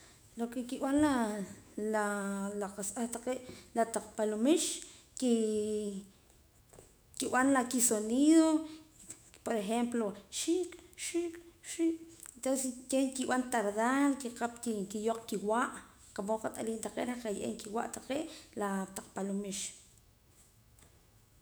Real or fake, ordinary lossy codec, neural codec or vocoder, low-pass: fake; none; autoencoder, 48 kHz, 128 numbers a frame, DAC-VAE, trained on Japanese speech; none